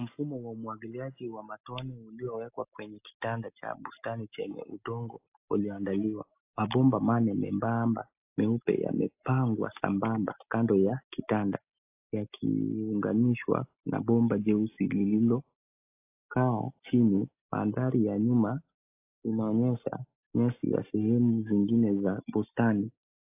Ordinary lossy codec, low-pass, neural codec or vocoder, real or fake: AAC, 32 kbps; 3.6 kHz; none; real